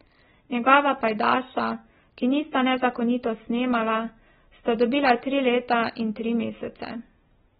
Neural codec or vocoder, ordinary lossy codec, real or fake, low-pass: none; AAC, 16 kbps; real; 19.8 kHz